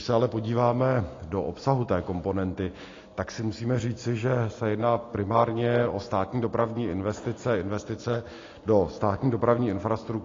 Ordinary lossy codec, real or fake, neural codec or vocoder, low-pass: AAC, 32 kbps; real; none; 7.2 kHz